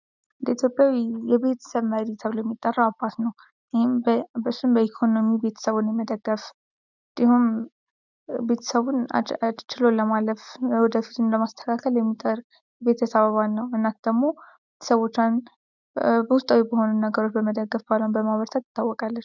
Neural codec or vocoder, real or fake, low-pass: none; real; 7.2 kHz